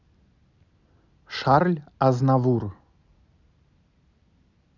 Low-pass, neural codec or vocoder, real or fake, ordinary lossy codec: 7.2 kHz; none; real; none